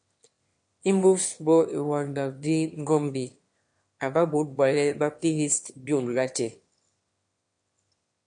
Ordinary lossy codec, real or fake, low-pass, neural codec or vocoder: MP3, 48 kbps; fake; 9.9 kHz; autoencoder, 22.05 kHz, a latent of 192 numbers a frame, VITS, trained on one speaker